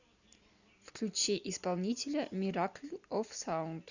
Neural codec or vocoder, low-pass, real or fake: none; 7.2 kHz; real